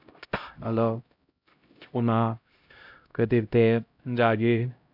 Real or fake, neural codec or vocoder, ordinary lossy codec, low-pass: fake; codec, 16 kHz, 0.5 kbps, X-Codec, HuBERT features, trained on LibriSpeech; none; 5.4 kHz